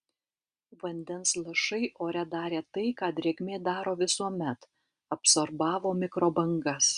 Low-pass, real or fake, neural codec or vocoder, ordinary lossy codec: 9.9 kHz; real; none; Opus, 64 kbps